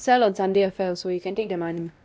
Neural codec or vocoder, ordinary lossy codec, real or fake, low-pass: codec, 16 kHz, 1 kbps, X-Codec, WavLM features, trained on Multilingual LibriSpeech; none; fake; none